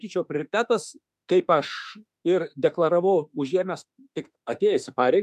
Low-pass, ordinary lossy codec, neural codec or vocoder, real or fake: 14.4 kHz; MP3, 96 kbps; autoencoder, 48 kHz, 32 numbers a frame, DAC-VAE, trained on Japanese speech; fake